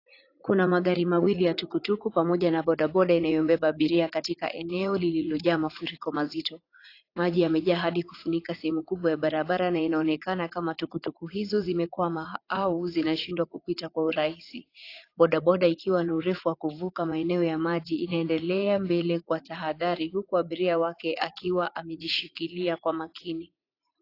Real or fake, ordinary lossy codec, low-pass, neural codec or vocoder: fake; AAC, 32 kbps; 5.4 kHz; vocoder, 44.1 kHz, 80 mel bands, Vocos